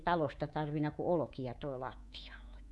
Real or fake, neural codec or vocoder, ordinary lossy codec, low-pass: fake; codec, 24 kHz, 3.1 kbps, DualCodec; none; none